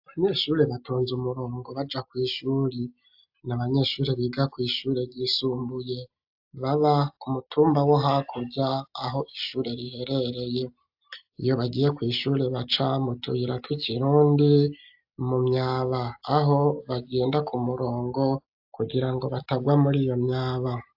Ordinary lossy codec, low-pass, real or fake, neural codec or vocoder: AAC, 48 kbps; 5.4 kHz; real; none